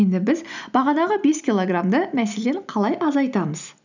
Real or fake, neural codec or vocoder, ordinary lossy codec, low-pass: real; none; none; 7.2 kHz